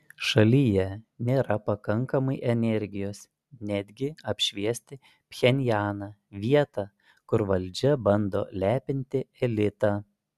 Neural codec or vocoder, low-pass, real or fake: none; 14.4 kHz; real